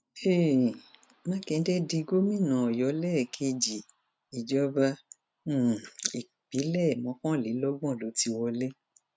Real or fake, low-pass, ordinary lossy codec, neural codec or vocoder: real; none; none; none